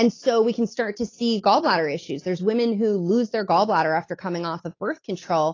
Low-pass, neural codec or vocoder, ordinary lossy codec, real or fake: 7.2 kHz; none; AAC, 32 kbps; real